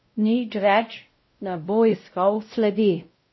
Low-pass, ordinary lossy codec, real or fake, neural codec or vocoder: 7.2 kHz; MP3, 24 kbps; fake; codec, 16 kHz, 0.5 kbps, X-Codec, WavLM features, trained on Multilingual LibriSpeech